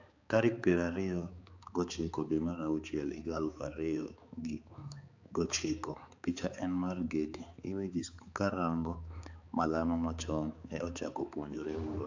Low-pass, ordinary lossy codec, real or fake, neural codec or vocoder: 7.2 kHz; AAC, 48 kbps; fake; codec, 16 kHz, 4 kbps, X-Codec, HuBERT features, trained on balanced general audio